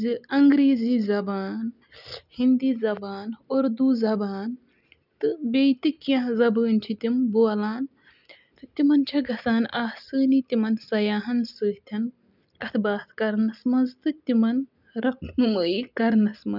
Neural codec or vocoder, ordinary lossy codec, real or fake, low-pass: none; none; real; 5.4 kHz